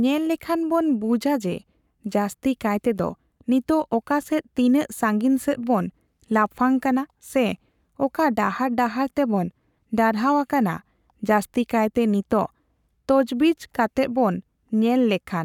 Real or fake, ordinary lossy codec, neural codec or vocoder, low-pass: fake; none; codec, 44.1 kHz, 7.8 kbps, Pupu-Codec; 19.8 kHz